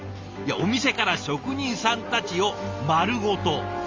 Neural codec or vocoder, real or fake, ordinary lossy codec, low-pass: none; real; Opus, 32 kbps; 7.2 kHz